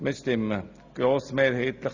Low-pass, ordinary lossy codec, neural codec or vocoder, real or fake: 7.2 kHz; Opus, 64 kbps; none; real